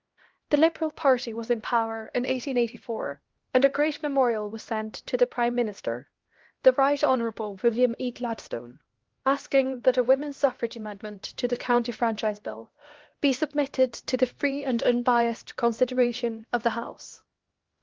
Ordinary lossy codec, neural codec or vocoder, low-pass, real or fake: Opus, 16 kbps; codec, 16 kHz, 1 kbps, X-Codec, HuBERT features, trained on LibriSpeech; 7.2 kHz; fake